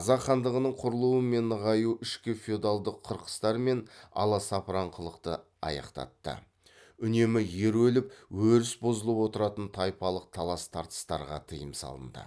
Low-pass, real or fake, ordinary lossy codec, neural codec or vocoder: none; real; none; none